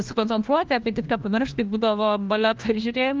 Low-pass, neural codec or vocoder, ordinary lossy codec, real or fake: 7.2 kHz; codec, 16 kHz, 1 kbps, FunCodec, trained on LibriTTS, 50 frames a second; Opus, 24 kbps; fake